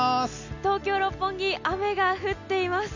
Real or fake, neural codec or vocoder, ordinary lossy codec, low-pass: real; none; none; 7.2 kHz